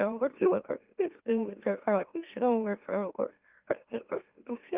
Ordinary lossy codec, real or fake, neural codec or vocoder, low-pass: Opus, 24 kbps; fake; autoencoder, 44.1 kHz, a latent of 192 numbers a frame, MeloTTS; 3.6 kHz